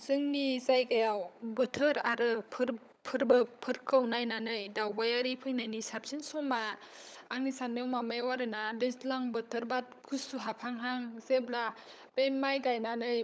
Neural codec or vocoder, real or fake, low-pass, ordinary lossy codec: codec, 16 kHz, 16 kbps, FunCodec, trained on LibriTTS, 50 frames a second; fake; none; none